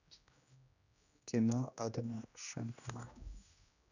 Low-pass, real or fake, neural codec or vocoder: 7.2 kHz; fake; codec, 16 kHz, 1 kbps, X-Codec, HuBERT features, trained on general audio